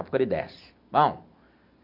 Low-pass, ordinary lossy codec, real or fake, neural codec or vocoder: 5.4 kHz; MP3, 48 kbps; real; none